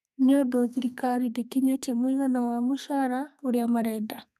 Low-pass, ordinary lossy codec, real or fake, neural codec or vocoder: 14.4 kHz; none; fake; codec, 32 kHz, 1.9 kbps, SNAC